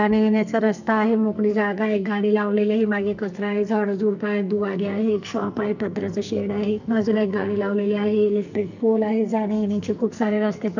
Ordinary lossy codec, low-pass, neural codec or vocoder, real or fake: none; 7.2 kHz; codec, 44.1 kHz, 2.6 kbps, SNAC; fake